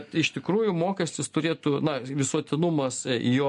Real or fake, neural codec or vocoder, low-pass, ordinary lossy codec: real; none; 10.8 kHz; MP3, 48 kbps